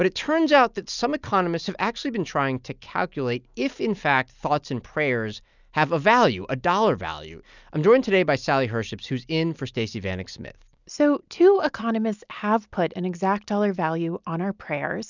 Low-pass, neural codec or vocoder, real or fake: 7.2 kHz; none; real